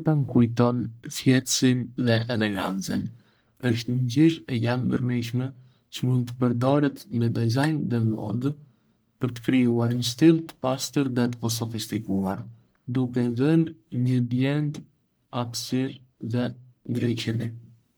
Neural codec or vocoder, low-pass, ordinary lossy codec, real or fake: codec, 44.1 kHz, 1.7 kbps, Pupu-Codec; none; none; fake